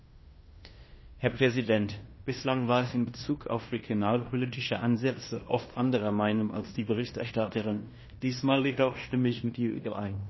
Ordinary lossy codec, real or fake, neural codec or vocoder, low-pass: MP3, 24 kbps; fake; codec, 16 kHz in and 24 kHz out, 0.9 kbps, LongCat-Audio-Codec, fine tuned four codebook decoder; 7.2 kHz